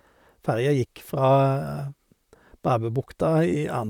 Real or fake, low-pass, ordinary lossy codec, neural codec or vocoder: fake; 19.8 kHz; none; vocoder, 44.1 kHz, 128 mel bands, Pupu-Vocoder